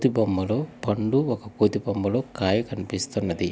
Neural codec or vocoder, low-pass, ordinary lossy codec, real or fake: none; none; none; real